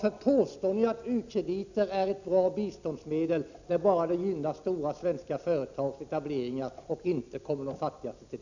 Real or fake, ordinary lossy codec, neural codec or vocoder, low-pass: real; none; none; 7.2 kHz